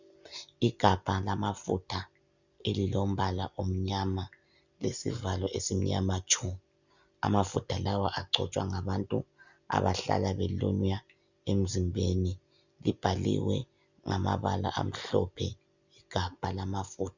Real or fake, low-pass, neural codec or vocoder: real; 7.2 kHz; none